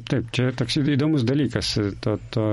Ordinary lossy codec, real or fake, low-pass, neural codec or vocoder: MP3, 48 kbps; real; 19.8 kHz; none